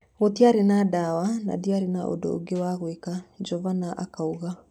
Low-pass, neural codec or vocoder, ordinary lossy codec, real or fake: 19.8 kHz; none; none; real